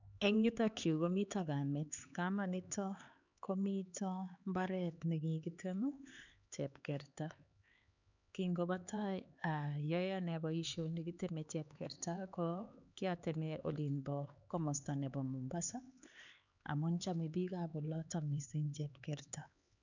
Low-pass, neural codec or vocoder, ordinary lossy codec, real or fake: 7.2 kHz; codec, 16 kHz, 4 kbps, X-Codec, HuBERT features, trained on LibriSpeech; none; fake